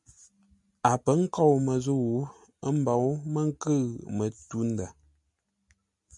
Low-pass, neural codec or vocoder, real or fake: 10.8 kHz; none; real